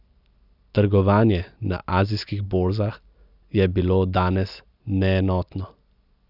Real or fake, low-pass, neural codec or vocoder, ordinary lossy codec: real; 5.4 kHz; none; none